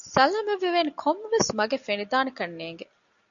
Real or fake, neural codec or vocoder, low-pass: real; none; 7.2 kHz